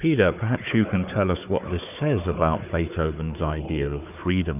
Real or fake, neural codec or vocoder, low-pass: fake; codec, 16 kHz, 4 kbps, FunCodec, trained on Chinese and English, 50 frames a second; 3.6 kHz